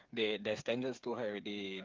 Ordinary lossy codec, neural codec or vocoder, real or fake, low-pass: Opus, 24 kbps; codec, 16 kHz, 8 kbps, FreqCodec, smaller model; fake; 7.2 kHz